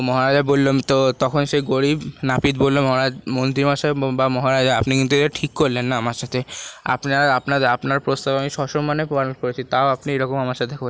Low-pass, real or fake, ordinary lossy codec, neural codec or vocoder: none; real; none; none